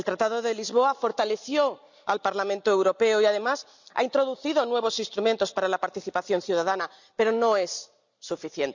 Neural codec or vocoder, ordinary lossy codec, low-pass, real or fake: none; none; 7.2 kHz; real